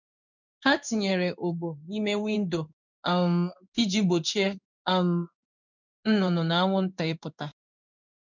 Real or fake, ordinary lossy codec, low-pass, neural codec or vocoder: fake; none; 7.2 kHz; codec, 16 kHz in and 24 kHz out, 1 kbps, XY-Tokenizer